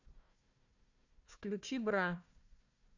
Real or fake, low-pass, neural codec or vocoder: fake; 7.2 kHz; codec, 16 kHz, 1 kbps, FunCodec, trained on Chinese and English, 50 frames a second